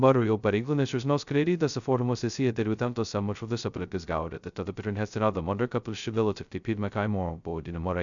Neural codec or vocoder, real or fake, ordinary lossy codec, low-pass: codec, 16 kHz, 0.2 kbps, FocalCodec; fake; MP3, 96 kbps; 7.2 kHz